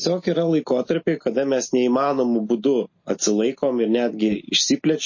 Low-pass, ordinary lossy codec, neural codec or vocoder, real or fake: 7.2 kHz; MP3, 32 kbps; none; real